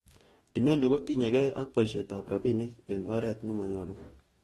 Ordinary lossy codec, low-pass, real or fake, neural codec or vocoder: AAC, 32 kbps; 19.8 kHz; fake; codec, 44.1 kHz, 2.6 kbps, DAC